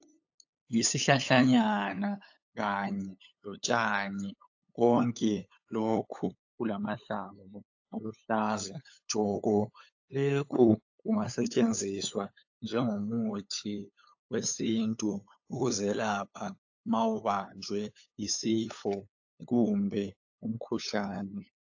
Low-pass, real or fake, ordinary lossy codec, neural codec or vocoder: 7.2 kHz; fake; AAC, 48 kbps; codec, 16 kHz, 8 kbps, FunCodec, trained on LibriTTS, 25 frames a second